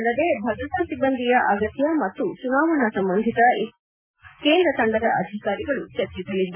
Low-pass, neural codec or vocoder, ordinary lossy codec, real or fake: 3.6 kHz; none; none; real